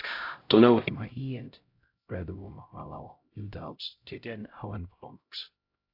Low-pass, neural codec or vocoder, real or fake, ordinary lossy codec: 5.4 kHz; codec, 16 kHz, 0.5 kbps, X-Codec, HuBERT features, trained on LibriSpeech; fake; AAC, 32 kbps